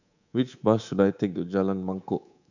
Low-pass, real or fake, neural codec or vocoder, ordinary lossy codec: 7.2 kHz; fake; codec, 24 kHz, 3.1 kbps, DualCodec; none